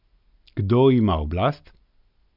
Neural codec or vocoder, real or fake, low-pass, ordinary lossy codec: none; real; 5.4 kHz; none